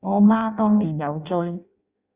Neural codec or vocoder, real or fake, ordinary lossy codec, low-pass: codec, 16 kHz in and 24 kHz out, 0.6 kbps, FireRedTTS-2 codec; fake; Opus, 64 kbps; 3.6 kHz